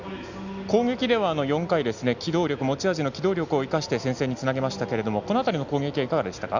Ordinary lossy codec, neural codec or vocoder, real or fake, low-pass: none; none; real; 7.2 kHz